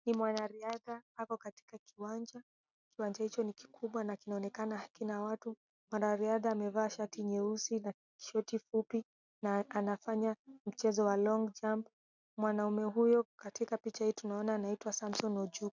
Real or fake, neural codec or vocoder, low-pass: real; none; 7.2 kHz